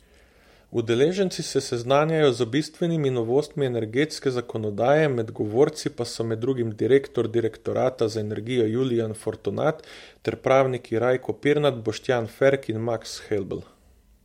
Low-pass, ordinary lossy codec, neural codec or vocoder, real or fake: 19.8 kHz; MP3, 64 kbps; none; real